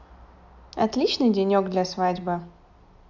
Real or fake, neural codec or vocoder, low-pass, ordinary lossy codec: real; none; 7.2 kHz; none